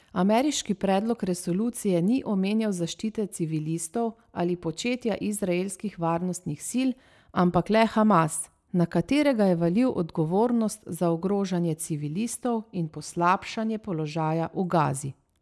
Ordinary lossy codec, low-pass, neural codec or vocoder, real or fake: none; none; none; real